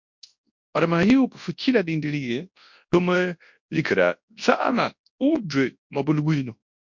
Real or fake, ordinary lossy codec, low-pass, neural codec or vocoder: fake; MP3, 48 kbps; 7.2 kHz; codec, 24 kHz, 0.9 kbps, WavTokenizer, large speech release